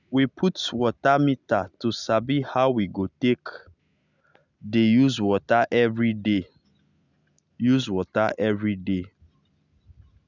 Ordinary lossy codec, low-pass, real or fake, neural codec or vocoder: none; 7.2 kHz; real; none